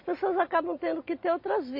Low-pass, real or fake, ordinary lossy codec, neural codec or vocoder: 5.4 kHz; real; MP3, 32 kbps; none